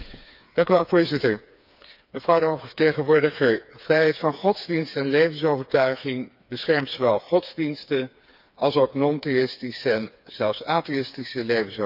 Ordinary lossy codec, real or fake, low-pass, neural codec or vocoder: none; fake; 5.4 kHz; codec, 16 kHz, 4 kbps, FreqCodec, smaller model